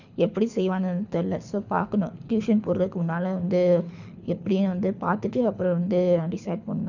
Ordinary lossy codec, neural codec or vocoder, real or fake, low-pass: none; codec, 24 kHz, 6 kbps, HILCodec; fake; 7.2 kHz